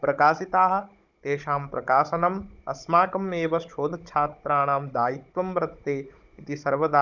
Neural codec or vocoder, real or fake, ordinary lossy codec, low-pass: codec, 16 kHz, 16 kbps, FunCodec, trained on Chinese and English, 50 frames a second; fake; none; 7.2 kHz